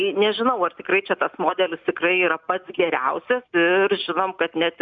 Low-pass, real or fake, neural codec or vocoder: 7.2 kHz; real; none